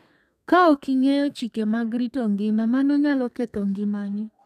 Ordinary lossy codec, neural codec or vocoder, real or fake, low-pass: none; codec, 32 kHz, 1.9 kbps, SNAC; fake; 14.4 kHz